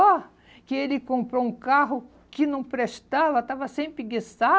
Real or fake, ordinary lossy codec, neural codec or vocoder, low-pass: real; none; none; none